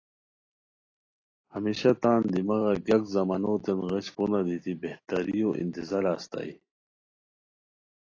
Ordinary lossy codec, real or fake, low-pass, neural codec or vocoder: AAC, 32 kbps; real; 7.2 kHz; none